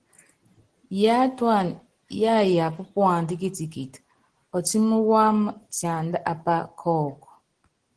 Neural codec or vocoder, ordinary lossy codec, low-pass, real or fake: none; Opus, 16 kbps; 9.9 kHz; real